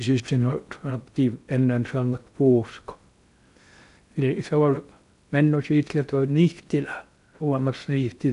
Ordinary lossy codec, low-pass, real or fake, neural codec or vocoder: none; 10.8 kHz; fake; codec, 16 kHz in and 24 kHz out, 0.6 kbps, FocalCodec, streaming, 2048 codes